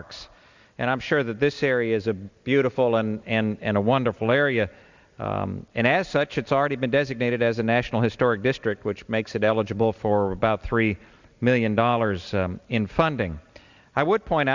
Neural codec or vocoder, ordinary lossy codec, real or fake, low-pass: none; Opus, 64 kbps; real; 7.2 kHz